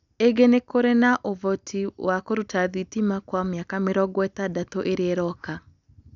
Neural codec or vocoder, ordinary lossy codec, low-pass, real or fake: none; none; 7.2 kHz; real